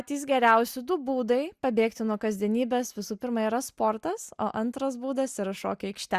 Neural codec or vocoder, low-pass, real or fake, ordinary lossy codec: none; 14.4 kHz; real; Opus, 64 kbps